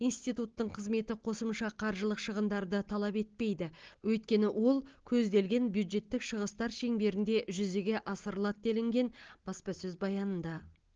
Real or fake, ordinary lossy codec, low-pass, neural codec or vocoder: real; Opus, 16 kbps; 7.2 kHz; none